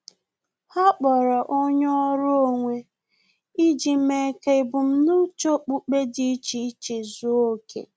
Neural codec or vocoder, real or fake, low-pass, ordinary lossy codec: none; real; none; none